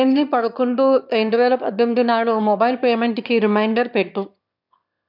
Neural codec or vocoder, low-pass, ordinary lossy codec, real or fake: autoencoder, 22.05 kHz, a latent of 192 numbers a frame, VITS, trained on one speaker; 5.4 kHz; none; fake